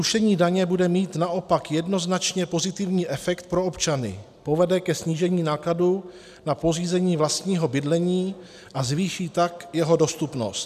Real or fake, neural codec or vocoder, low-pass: real; none; 14.4 kHz